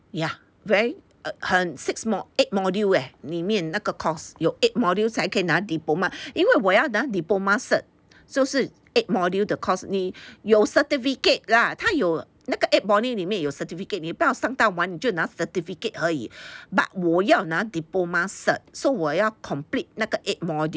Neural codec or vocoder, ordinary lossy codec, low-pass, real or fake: none; none; none; real